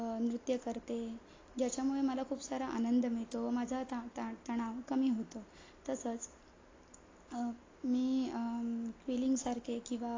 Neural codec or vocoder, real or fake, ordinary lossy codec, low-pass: none; real; AAC, 32 kbps; 7.2 kHz